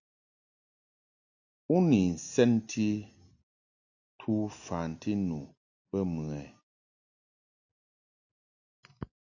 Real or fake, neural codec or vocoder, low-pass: real; none; 7.2 kHz